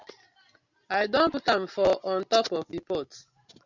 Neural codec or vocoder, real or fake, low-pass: none; real; 7.2 kHz